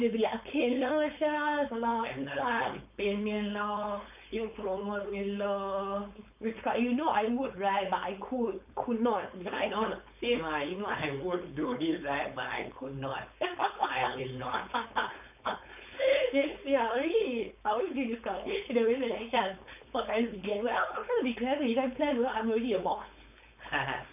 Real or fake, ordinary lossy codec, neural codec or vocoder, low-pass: fake; none; codec, 16 kHz, 4.8 kbps, FACodec; 3.6 kHz